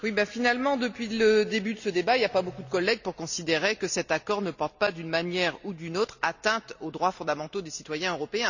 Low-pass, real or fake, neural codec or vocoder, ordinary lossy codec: 7.2 kHz; real; none; none